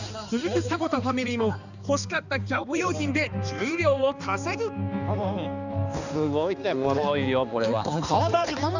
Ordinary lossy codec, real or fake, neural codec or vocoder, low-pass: none; fake; codec, 16 kHz, 2 kbps, X-Codec, HuBERT features, trained on balanced general audio; 7.2 kHz